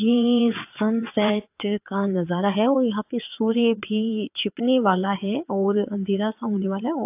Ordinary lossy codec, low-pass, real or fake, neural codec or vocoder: AAC, 32 kbps; 3.6 kHz; fake; vocoder, 22.05 kHz, 80 mel bands, WaveNeXt